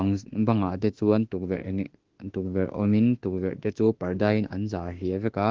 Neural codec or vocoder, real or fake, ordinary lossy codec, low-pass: autoencoder, 48 kHz, 32 numbers a frame, DAC-VAE, trained on Japanese speech; fake; Opus, 16 kbps; 7.2 kHz